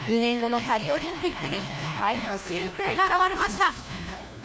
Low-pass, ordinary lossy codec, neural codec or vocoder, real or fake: none; none; codec, 16 kHz, 1 kbps, FunCodec, trained on LibriTTS, 50 frames a second; fake